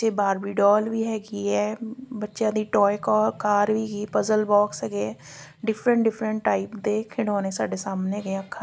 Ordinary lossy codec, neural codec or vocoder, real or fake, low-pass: none; none; real; none